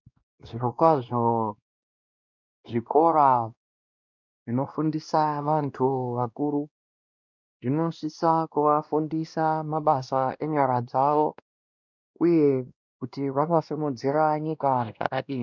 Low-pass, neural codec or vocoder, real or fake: 7.2 kHz; codec, 16 kHz, 1 kbps, X-Codec, WavLM features, trained on Multilingual LibriSpeech; fake